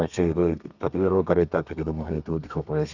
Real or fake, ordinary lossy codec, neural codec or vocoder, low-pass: fake; none; codec, 32 kHz, 1.9 kbps, SNAC; 7.2 kHz